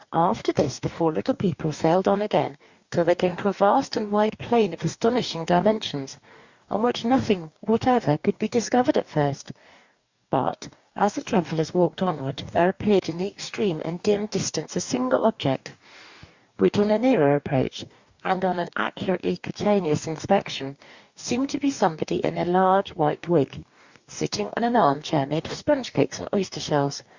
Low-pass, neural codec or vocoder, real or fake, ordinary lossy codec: 7.2 kHz; codec, 44.1 kHz, 2.6 kbps, DAC; fake; Opus, 64 kbps